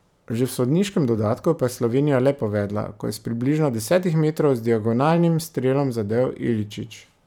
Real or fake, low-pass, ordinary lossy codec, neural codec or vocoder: fake; 19.8 kHz; none; vocoder, 44.1 kHz, 128 mel bands every 512 samples, BigVGAN v2